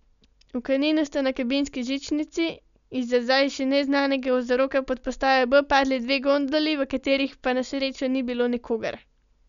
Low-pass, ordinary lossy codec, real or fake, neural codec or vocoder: 7.2 kHz; none; real; none